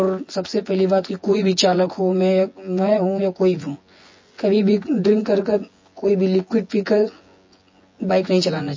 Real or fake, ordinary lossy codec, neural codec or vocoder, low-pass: fake; MP3, 32 kbps; vocoder, 24 kHz, 100 mel bands, Vocos; 7.2 kHz